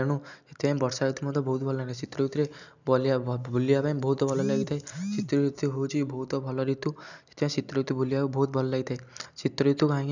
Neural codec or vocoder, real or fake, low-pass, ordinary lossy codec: none; real; 7.2 kHz; none